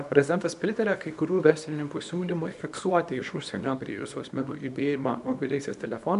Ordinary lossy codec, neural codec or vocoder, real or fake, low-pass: AAC, 96 kbps; codec, 24 kHz, 0.9 kbps, WavTokenizer, small release; fake; 10.8 kHz